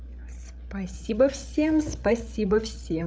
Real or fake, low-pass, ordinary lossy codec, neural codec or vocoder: fake; none; none; codec, 16 kHz, 8 kbps, FreqCodec, larger model